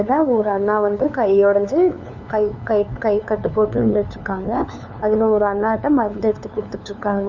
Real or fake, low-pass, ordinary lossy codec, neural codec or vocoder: fake; 7.2 kHz; none; codec, 16 kHz, 2 kbps, FunCodec, trained on LibriTTS, 25 frames a second